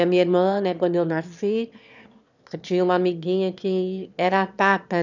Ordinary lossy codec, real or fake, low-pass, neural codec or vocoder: none; fake; 7.2 kHz; autoencoder, 22.05 kHz, a latent of 192 numbers a frame, VITS, trained on one speaker